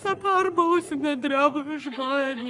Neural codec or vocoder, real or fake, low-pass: codec, 44.1 kHz, 3.4 kbps, Pupu-Codec; fake; 10.8 kHz